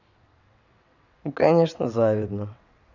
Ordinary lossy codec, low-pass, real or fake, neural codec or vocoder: none; 7.2 kHz; fake; vocoder, 22.05 kHz, 80 mel bands, WaveNeXt